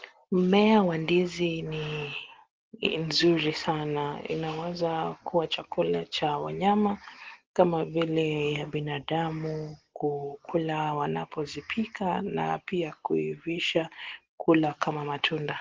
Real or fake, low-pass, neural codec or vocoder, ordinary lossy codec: real; 7.2 kHz; none; Opus, 16 kbps